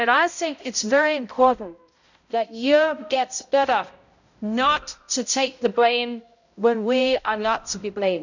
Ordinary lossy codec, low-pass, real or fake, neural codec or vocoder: none; 7.2 kHz; fake; codec, 16 kHz, 0.5 kbps, X-Codec, HuBERT features, trained on balanced general audio